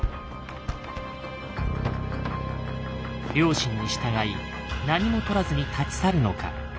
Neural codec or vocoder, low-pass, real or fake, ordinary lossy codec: none; none; real; none